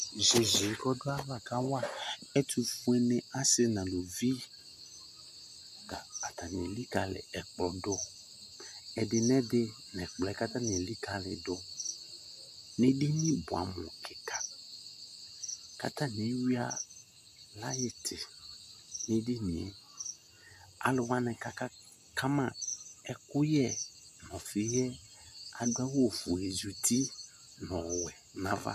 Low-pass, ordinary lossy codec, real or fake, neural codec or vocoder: 14.4 kHz; MP3, 96 kbps; real; none